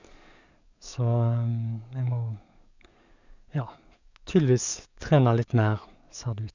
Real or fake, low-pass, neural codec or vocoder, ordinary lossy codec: fake; 7.2 kHz; codec, 44.1 kHz, 7.8 kbps, DAC; none